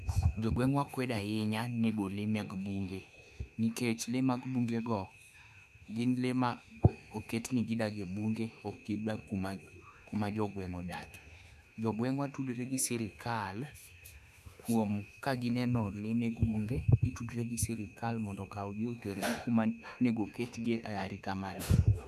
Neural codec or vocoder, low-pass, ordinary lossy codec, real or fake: autoencoder, 48 kHz, 32 numbers a frame, DAC-VAE, trained on Japanese speech; 14.4 kHz; none; fake